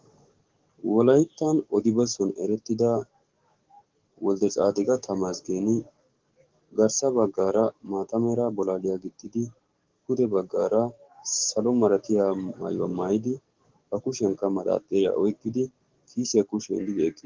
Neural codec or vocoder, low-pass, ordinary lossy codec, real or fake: codec, 44.1 kHz, 7.8 kbps, DAC; 7.2 kHz; Opus, 16 kbps; fake